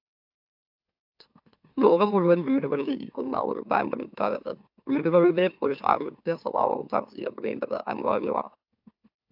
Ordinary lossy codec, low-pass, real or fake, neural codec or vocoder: AAC, 48 kbps; 5.4 kHz; fake; autoencoder, 44.1 kHz, a latent of 192 numbers a frame, MeloTTS